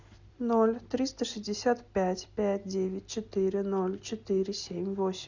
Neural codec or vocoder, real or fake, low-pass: none; real; 7.2 kHz